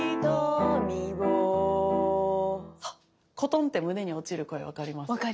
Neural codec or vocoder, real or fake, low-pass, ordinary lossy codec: none; real; none; none